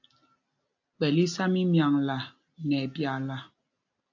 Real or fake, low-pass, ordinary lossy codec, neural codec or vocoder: real; 7.2 kHz; AAC, 48 kbps; none